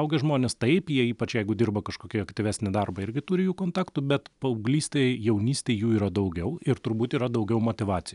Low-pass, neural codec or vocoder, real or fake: 10.8 kHz; none; real